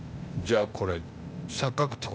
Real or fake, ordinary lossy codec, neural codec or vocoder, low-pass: fake; none; codec, 16 kHz, 0.8 kbps, ZipCodec; none